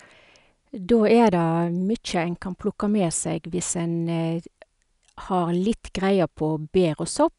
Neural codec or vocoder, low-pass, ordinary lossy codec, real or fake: none; 10.8 kHz; none; real